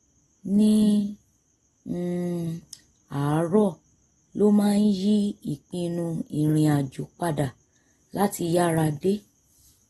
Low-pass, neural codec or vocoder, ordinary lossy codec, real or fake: 19.8 kHz; none; AAC, 32 kbps; real